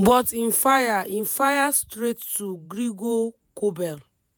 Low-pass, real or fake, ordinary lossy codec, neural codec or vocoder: none; fake; none; vocoder, 48 kHz, 128 mel bands, Vocos